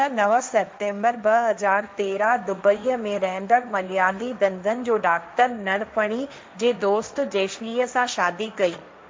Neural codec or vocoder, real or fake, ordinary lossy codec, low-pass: codec, 16 kHz, 1.1 kbps, Voila-Tokenizer; fake; none; none